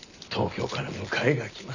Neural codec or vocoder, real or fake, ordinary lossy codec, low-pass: none; real; none; 7.2 kHz